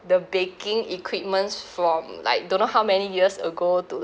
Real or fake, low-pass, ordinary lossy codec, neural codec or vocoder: real; none; none; none